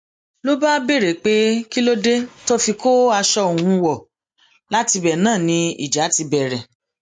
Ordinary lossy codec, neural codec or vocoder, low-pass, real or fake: MP3, 48 kbps; none; 9.9 kHz; real